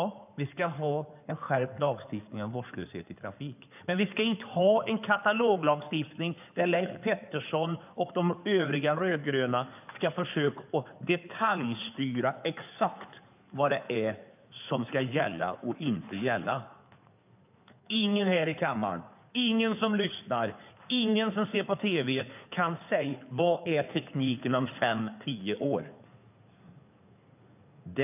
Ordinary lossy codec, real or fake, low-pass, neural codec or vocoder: none; fake; 3.6 kHz; codec, 16 kHz in and 24 kHz out, 2.2 kbps, FireRedTTS-2 codec